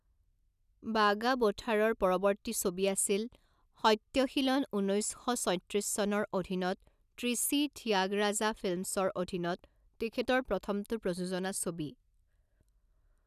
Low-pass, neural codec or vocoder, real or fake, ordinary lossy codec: 14.4 kHz; none; real; none